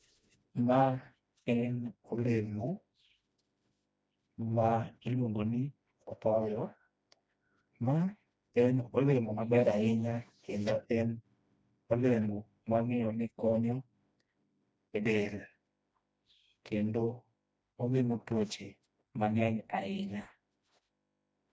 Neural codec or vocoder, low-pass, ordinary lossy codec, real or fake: codec, 16 kHz, 1 kbps, FreqCodec, smaller model; none; none; fake